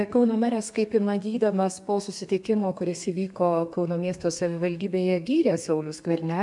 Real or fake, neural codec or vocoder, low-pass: fake; codec, 32 kHz, 1.9 kbps, SNAC; 10.8 kHz